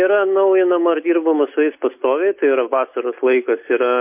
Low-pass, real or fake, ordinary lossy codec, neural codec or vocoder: 3.6 kHz; real; MP3, 32 kbps; none